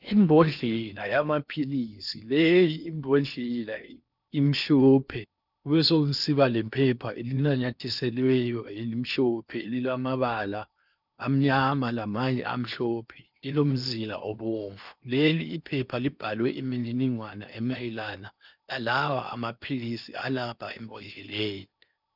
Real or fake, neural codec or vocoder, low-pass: fake; codec, 16 kHz in and 24 kHz out, 0.8 kbps, FocalCodec, streaming, 65536 codes; 5.4 kHz